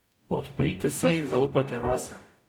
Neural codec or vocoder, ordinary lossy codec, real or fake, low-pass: codec, 44.1 kHz, 0.9 kbps, DAC; none; fake; none